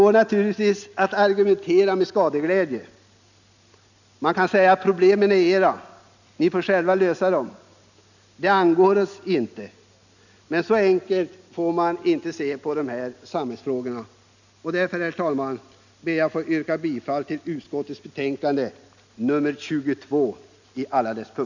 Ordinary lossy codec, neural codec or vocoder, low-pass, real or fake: none; none; 7.2 kHz; real